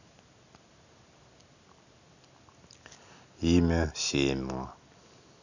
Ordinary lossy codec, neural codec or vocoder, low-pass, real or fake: none; none; 7.2 kHz; real